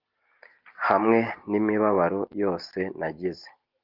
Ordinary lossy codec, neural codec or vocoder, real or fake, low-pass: Opus, 32 kbps; none; real; 5.4 kHz